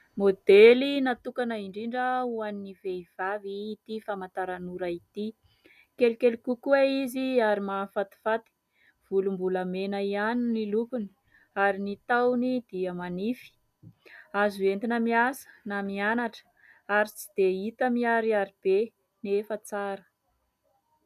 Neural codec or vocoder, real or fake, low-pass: none; real; 14.4 kHz